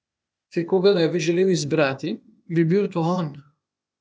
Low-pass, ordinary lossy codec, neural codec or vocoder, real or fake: none; none; codec, 16 kHz, 0.8 kbps, ZipCodec; fake